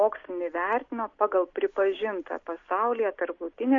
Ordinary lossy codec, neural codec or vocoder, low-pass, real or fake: MP3, 48 kbps; none; 7.2 kHz; real